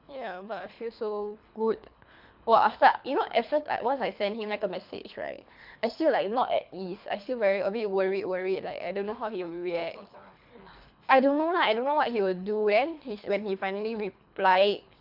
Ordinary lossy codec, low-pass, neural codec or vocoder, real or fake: MP3, 48 kbps; 5.4 kHz; codec, 24 kHz, 3 kbps, HILCodec; fake